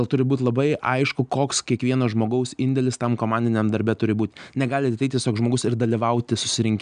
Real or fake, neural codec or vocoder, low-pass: real; none; 9.9 kHz